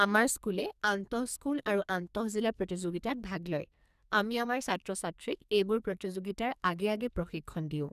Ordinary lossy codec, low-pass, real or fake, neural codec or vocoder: none; 14.4 kHz; fake; codec, 44.1 kHz, 2.6 kbps, SNAC